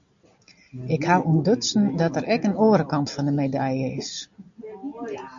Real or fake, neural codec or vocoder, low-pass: real; none; 7.2 kHz